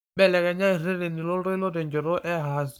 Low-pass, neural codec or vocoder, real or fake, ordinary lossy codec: none; codec, 44.1 kHz, 7.8 kbps, Pupu-Codec; fake; none